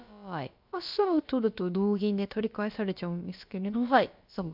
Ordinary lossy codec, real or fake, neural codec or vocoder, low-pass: MP3, 48 kbps; fake; codec, 16 kHz, about 1 kbps, DyCAST, with the encoder's durations; 5.4 kHz